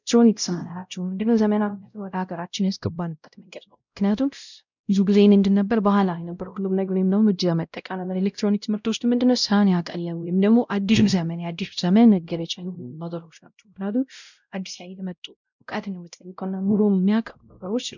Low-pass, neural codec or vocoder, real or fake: 7.2 kHz; codec, 16 kHz, 0.5 kbps, X-Codec, WavLM features, trained on Multilingual LibriSpeech; fake